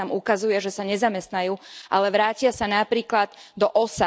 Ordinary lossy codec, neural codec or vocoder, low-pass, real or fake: none; none; none; real